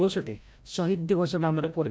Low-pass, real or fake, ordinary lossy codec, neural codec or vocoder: none; fake; none; codec, 16 kHz, 0.5 kbps, FreqCodec, larger model